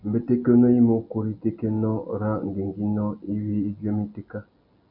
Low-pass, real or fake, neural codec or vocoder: 5.4 kHz; real; none